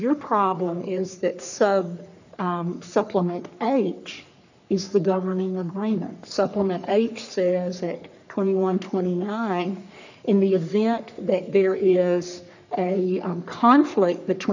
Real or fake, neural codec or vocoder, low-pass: fake; codec, 44.1 kHz, 3.4 kbps, Pupu-Codec; 7.2 kHz